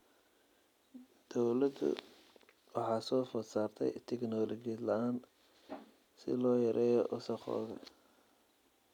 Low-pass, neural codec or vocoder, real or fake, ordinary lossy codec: 19.8 kHz; none; real; none